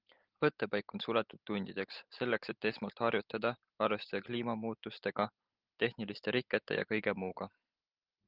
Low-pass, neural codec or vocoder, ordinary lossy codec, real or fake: 5.4 kHz; none; Opus, 32 kbps; real